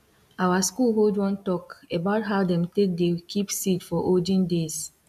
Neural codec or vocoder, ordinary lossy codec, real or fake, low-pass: none; AAC, 96 kbps; real; 14.4 kHz